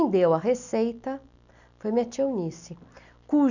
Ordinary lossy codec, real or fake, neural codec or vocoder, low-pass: none; real; none; 7.2 kHz